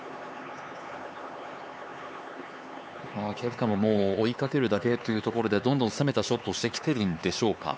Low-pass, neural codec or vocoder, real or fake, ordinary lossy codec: none; codec, 16 kHz, 4 kbps, X-Codec, HuBERT features, trained on LibriSpeech; fake; none